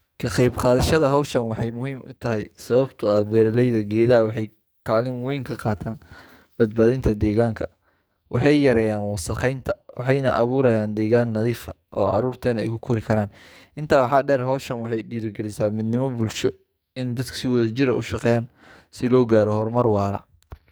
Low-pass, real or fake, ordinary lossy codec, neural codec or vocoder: none; fake; none; codec, 44.1 kHz, 2.6 kbps, SNAC